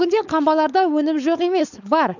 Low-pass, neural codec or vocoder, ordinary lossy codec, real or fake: 7.2 kHz; codec, 16 kHz, 4.8 kbps, FACodec; none; fake